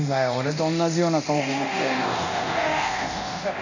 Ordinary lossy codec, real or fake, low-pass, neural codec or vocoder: none; fake; 7.2 kHz; codec, 24 kHz, 0.9 kbps, DualCodec